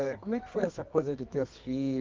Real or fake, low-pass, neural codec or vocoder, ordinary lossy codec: fake; 7.2 kHz; codec, 24 kHz, 0.9 kbps, WavTokenizer, medium music audio release; Opus, 24 kbps